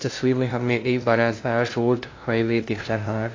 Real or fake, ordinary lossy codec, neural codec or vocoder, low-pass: fake; AAC, 32 kbps; codec, 16 kHz, 0.5 kbps, FunCodec, trained on LibriTTS, 25 frames a second; 7.2 kHz